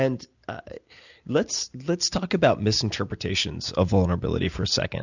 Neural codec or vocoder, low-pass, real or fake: none; 7.2 kHz; real